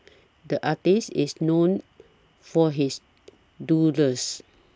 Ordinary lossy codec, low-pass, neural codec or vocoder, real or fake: none; none; none; real